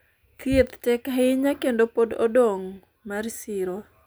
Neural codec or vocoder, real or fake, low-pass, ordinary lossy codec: none; real; none; none